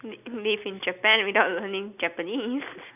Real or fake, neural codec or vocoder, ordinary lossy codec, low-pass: real; none; none; 3.6 kHz